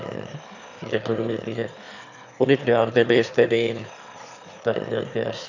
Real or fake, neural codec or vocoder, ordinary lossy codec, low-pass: fake; autoencoder, 22.05 kHz, a latent of 192 numbers a frame, VITS, trained on one speaker; none; 7.2 kHz